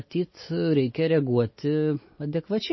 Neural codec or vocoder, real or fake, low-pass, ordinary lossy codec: none; real; 7.2 kHz; MP3, 24 kbps